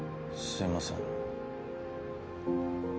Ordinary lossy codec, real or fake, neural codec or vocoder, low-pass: none; real; none; none